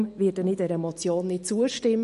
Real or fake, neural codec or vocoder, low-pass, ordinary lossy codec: real; none; 14.4 kHz; MP3, 48 kbps